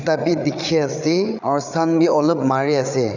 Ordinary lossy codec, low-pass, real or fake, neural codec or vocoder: none; 7.2 kHz; fake; codec, 16 kHz, 8 kbps, FreqCodec, larger model